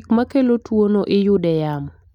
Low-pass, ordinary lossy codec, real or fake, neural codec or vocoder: 19.8 kHz; none; real; none